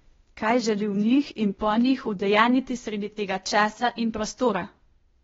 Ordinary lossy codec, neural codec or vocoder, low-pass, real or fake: AAC, 24 kbps; codec, 16 kHz, 0.8 kbps, ZipCodec; 7.2 kHz; fake